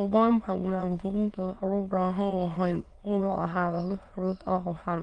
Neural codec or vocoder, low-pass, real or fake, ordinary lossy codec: autoencoder, 22.05 kHz, a latent of 192 numbers a frame, VITS, trained on many speakers; 9.9 kHz; fake; Opus, 24 kbps